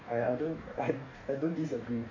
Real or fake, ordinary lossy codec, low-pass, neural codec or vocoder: fake; none; 7.2 kHz; codec, 44.1 kHz, 2.6 kbps, DAC